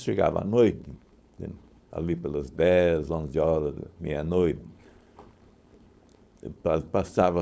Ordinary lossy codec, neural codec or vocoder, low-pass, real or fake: none; codec, 16 kHz, 4.8 kbps, FACodec; none; fake